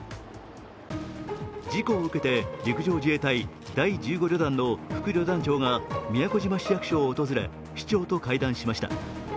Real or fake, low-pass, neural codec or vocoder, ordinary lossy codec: real; none; none; none